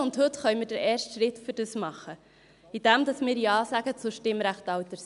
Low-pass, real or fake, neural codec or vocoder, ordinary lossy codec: 10.8 kHz; real; none; none